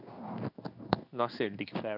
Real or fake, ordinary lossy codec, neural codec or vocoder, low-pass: fake; none; codec, 16 kHz in and 24 kHz out, 1 kbps, XY-Tokenizer; 5.4 kHz